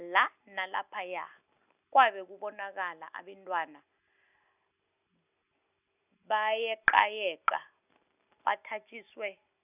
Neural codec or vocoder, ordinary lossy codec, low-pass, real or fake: none; none; 3.6 kHz; real